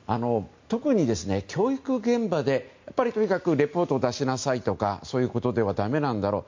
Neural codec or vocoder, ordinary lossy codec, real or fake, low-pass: none; MP3, 48 kbps; real; 7.2 kHz